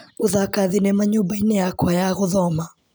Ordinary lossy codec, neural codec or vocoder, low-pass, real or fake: none; none; none; real